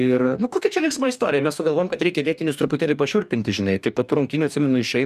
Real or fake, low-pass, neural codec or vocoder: fake; 14.4 kHz; codec, 44.1 kHz, 2.6 kbps, DAC